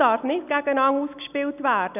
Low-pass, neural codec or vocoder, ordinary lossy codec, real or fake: 3.6 kHz; none; none; real